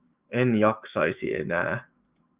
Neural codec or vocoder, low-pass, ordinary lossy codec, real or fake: autoencoder, 48 kHz, 128 numbers a frame, DAC-VAE, trained on Japanese speech; 3.6 kHz; Opus, 24 kbps; fake